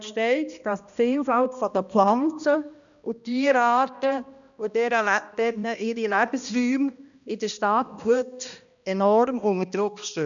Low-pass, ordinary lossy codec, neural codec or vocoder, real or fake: 7.2 kHz; none; codec, 16 kHz, 1 kbps, X-Codec, HuBERT features, trained on balanced general audio; fake